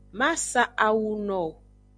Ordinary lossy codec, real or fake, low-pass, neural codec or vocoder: MP3, 64 kbps; real; 9.9 kHz; none